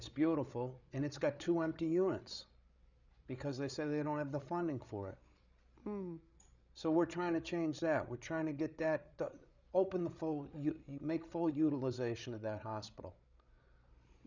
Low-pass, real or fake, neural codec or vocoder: 7.2 kHz; fake; codec, 16 kHz, 8 kbps, FreqCodec, larger model